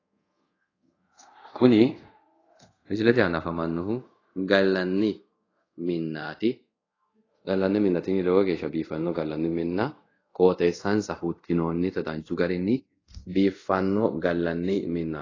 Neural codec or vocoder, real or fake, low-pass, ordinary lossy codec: codec, 24 kHz, 0.5 kbps, DualCodec; fake; 7.2 kHz; AAC, 32 kbps